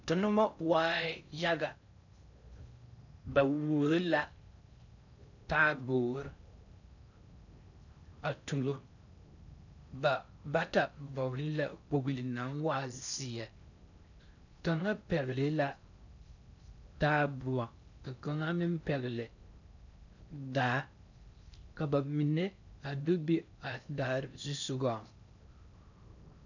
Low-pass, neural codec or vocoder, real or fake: 7.2 kHz; codec, 16 kHz in and 24 kHz out, 0.6 kbps, FocalCodec, streaming, 4096 codes; fake